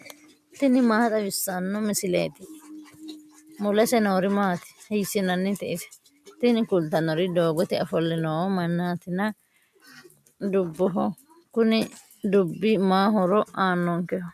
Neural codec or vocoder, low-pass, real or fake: none; 14.4 kHz; real